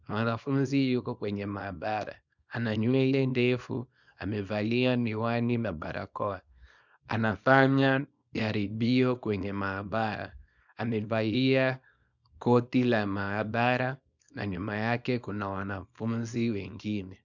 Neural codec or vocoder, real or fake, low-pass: codec, 24 kHz, 0.9 kbps, WavTokenizer, small release; fake; 7.2 kHz